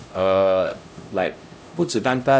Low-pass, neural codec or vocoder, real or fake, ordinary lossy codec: none; codec, 16 kHz, 0.5 kbps, X-Codec, HuBERT features, trained on LibriSpeech; fake; none